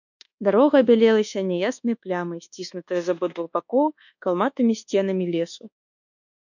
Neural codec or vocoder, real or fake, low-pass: codec, 24 kHz, 1.2 kbps, DualCodec; fake; 7.2 kHz